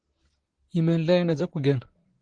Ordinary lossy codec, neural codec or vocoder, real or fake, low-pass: Opus, 16 kbps; vocoder, 44.1 kHz, 128 mel bands, Pupu-Vocoder; fake; 9.9 kHz